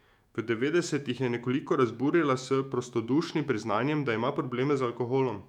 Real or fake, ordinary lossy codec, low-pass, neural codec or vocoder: fake; none; 19.8 kHz; autoencoder, 48 kHz, 128 numbers a frame, DAC-VAE, trained on Japanese speech